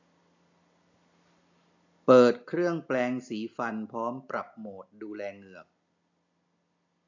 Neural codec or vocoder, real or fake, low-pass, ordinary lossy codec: none; real; 7.2 kHz; AAC, 48 kbps